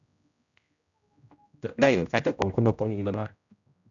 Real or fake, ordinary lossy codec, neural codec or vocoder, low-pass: fake; MP3, 96 kbps; codec, 16 kHz, 0.5 kbps, X-Codec, HuBERT features, trained on general audio; 7.2 kHz